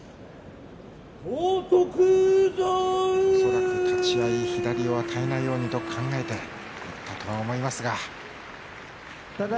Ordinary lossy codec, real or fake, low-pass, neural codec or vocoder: none; real; none; none